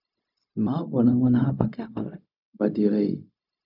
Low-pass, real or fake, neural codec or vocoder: 5.4 kHz; fake; codec, 16 kHz, 0.4 kbps, LongCat-Audio-Codec